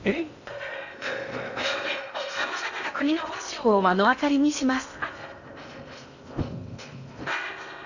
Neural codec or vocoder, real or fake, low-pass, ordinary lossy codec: codec, 16 kHz in and 24 kHz out, 0.6 kbps, FocalCodec, streaming, 2048 codes; fake; 7.2 kHz; none